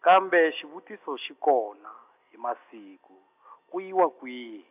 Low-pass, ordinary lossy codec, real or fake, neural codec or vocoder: 3.6 kHz; AAC, 32 kbps; real; none